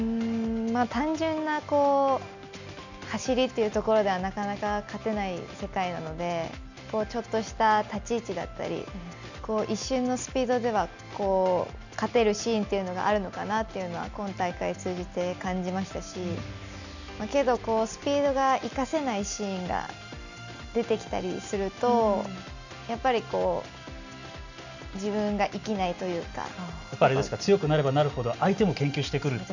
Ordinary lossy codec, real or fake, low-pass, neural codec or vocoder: none; real; 7.2 kHz; none